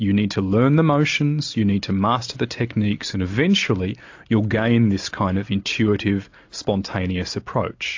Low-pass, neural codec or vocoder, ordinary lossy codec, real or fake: 7.2 kHz; none; AAC, 48 kbps; real